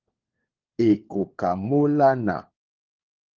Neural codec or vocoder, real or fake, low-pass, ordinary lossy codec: codec, 16 kHz, 4 kbps, FunCodec, trained on LibriTTS, 50 frames a second; fake; 7.2 kHz; Opus, 16 kbps